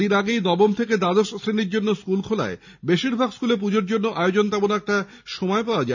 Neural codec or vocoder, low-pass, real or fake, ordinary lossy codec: none; 7.2 kHz; real; none